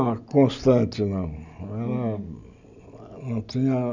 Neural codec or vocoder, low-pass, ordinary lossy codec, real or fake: none; 7.2 kHz; none; real